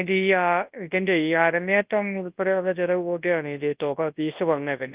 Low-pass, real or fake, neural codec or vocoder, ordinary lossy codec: 3.6 kHz; fake; codec, 24 kHz, 0.9 kbps, WavTokenizer, large speech release; Opus, 32 kbps